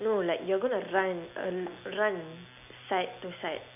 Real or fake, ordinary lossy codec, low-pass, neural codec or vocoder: real; none; 3.6 kHz; none